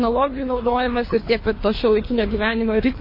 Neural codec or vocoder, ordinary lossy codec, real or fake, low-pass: codec, 24 kHz, 3 kbps, HILCodec; MP3, 24 kbps; fake; 5.4 kHz